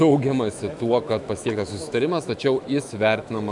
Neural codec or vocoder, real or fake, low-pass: autoencoder, 48 kHz, 128 numbers a frame, DAC-VAE, trained on Japanese speech; fake; 10.8 kHz